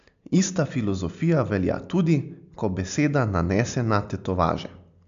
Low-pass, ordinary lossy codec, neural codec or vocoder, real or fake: 7.2 kHz; MP3, 64 kbps; none; real